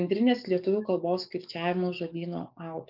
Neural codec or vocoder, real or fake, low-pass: codec, 44.1 kHz, 7.8 kbps, DAC; fake; 5.4 kHz